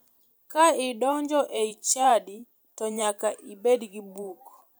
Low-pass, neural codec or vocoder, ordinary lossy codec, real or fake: none; vocoder, 44.1 kHz, 128 mel bands every 512 samples, BigVGAN v2; none; fake